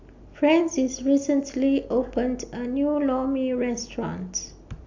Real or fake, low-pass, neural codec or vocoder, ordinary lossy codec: real; 7.2 kHz; none; AAC, 48 kbps